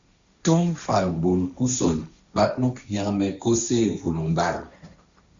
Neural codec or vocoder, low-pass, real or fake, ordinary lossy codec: codec, 16 kHz, 1.1 kbps, Voila-Tokenizer; 7.2 kHz; fake; Opus, 64 kbps